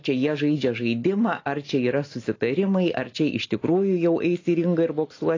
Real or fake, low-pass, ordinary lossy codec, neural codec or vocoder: real; 7.2 kHz; AAC, 32 kbps; none